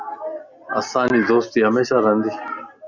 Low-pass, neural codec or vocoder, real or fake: 7.2 kHz; none; real